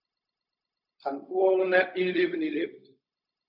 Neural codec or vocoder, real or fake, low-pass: codec, 16 kHz, 0.4 kbps, LongCat-Audio-Codec; fake; 5.4 kHz